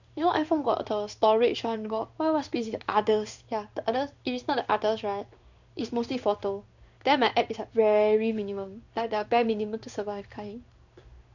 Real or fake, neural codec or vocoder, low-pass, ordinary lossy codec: fake; codec, 16 kHz in and 24 kHz out, 1 kbps, XY-Tokenizer; 7.2 kHz; none